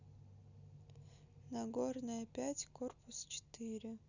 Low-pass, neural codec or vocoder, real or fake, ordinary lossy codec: 7.2 kHz; none; real; none